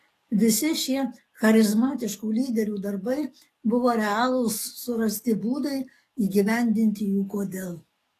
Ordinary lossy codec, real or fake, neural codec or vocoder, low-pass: AAC, 48 kbps; fake; codec, 44.1 kHz, 7.8 kbps, DAC; 14.4 kHz